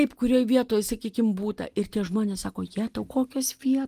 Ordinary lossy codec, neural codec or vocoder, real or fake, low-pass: Opus, 24 kbps; none; real; 14.4 kHz